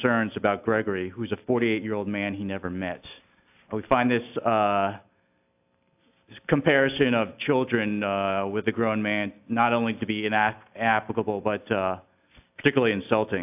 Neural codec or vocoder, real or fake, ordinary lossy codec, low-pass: none; real; AAC, 32 kbps; 3.6 kHz